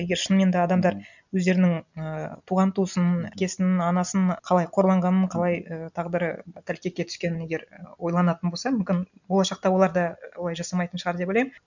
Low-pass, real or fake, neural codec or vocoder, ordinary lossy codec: 7.2 kHz; real; none; none